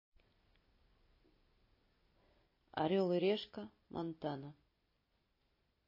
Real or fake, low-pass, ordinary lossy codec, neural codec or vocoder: real; 5.4 kHz; MP3, 24 kbps; none